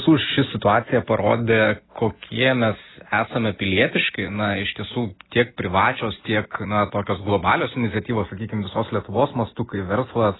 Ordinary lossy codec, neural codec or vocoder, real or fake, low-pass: AAC, 16 kbps; none; real; 7.2 kHz